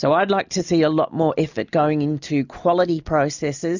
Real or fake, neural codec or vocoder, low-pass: real; none; 7.2 kHz